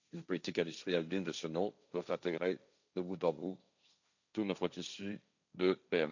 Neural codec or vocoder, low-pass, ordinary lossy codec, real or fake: codec, 16 kHz, 1.1 kbps, Voila-Tokenizer; 7.2 kHz; none; fake